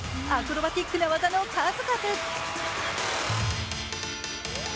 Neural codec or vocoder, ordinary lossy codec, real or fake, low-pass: none; none; real; none